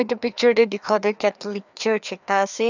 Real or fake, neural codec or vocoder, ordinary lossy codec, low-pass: fake; codec, 16 kHz, 2 kbps, FreqCodec, larger model; none; 7.2 kHz